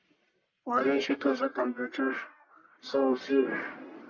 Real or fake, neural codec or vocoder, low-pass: fake; codec, 44.1 kHz, 1.7 kbps, Pupu-Codec; 7.2 kHz